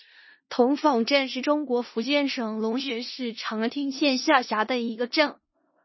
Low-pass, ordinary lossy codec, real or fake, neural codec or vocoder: 7.2 kHz; MP3, 24 kbps; fake; codec, 16 kHz in and 24 kHz out, 0.4 kbps, LongCat-Audio-Codec, four codebook decoder